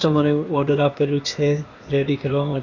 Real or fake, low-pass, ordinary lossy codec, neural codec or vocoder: fake; 7.2 kHz; Opus, 64 kbps; codec, 16 kHz, 0.8 kbps, ZipCodec